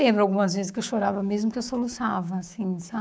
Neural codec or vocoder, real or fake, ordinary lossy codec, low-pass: codec, 16 kHz, 6 kbps, DAC; fake; none; none